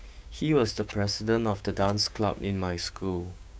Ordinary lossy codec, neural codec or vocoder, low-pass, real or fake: none; codec, 16 kHz, 6 kbps, DAC; none; fake